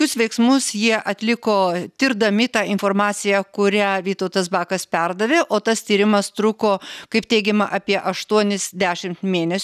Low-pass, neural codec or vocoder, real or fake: 14.4 kHz; none; real